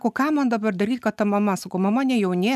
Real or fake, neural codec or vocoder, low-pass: real; none; 14.4 kHz